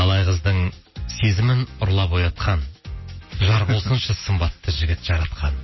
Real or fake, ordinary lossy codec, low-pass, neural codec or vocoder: real; MP3, 24 kbps; 7.2 kHz; none